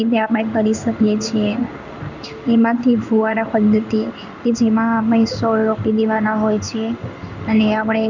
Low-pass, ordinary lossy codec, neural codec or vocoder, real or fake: 7.2 kHz; none; codec, 16 kHz in and 24 kHz out, 1 kbps, XY-Tokenizer; fake